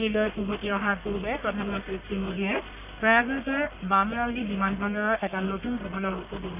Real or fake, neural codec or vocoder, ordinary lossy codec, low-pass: fake; codec, 44.1 kHz, 1.7 kbps, Pupu-Codec; none; 3.6 kHz